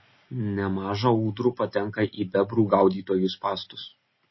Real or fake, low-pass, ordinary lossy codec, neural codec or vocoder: real; 7.2 kHz; MP3, 24 kbps; none